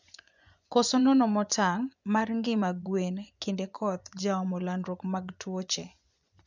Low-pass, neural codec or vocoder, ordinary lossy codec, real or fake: 7.2 kHz; vocoder, 24 kHz, 100 mel bands, Vocos; none; fake